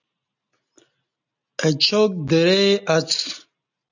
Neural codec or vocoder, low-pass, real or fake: none; 7.2 kHz; real